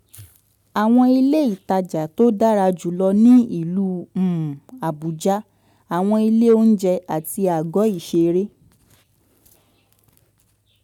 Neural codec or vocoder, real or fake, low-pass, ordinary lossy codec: none; real; 19.8 kHz; none